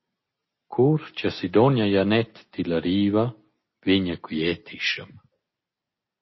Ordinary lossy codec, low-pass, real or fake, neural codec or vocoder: MP3, 24 kbps; 7.2 kHz; real; none